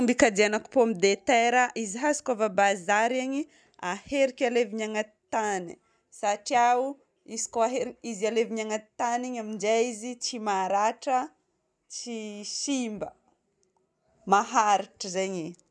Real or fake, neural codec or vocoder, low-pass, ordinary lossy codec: real; none; none; none